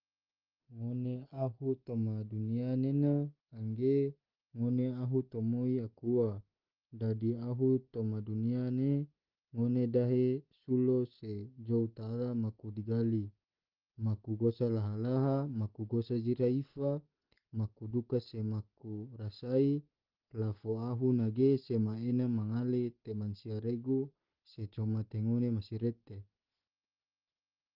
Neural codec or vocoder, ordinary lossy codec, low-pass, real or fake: none; Opus, 16 kbps; 5.4 kHz; real